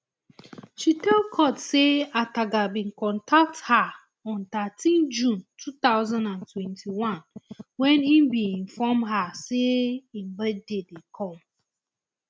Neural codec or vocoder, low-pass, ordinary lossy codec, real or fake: none; none; none; real